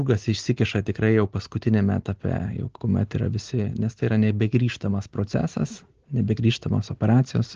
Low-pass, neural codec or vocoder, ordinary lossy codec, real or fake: 7.2 kHz; none; Opus, 16 kbps; real